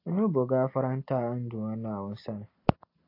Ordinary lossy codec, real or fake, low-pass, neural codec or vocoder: AAC, 32 kbps; real; 5.4 kHz; none